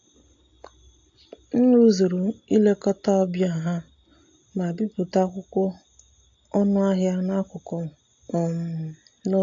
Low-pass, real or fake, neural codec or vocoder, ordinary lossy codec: 7.2 kHz; real; none; AAC, 48 kbps